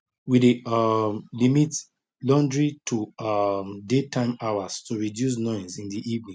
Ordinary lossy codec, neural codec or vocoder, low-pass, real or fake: none; none; none; real